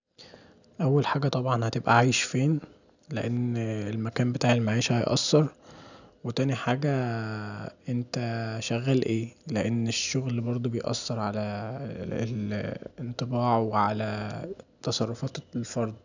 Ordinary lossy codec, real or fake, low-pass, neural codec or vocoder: none; real; 7.2 kHz; none